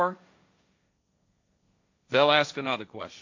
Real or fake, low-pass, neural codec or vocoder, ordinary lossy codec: fake; 7.2 kHz; codec, 16 kHz, 1.1 kbps, Voila-Tokenizer; AAC, 48 kbps